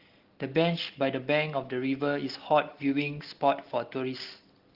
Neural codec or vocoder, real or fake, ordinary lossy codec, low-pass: none; real; Opus, 16 kbps; 5.4 kHz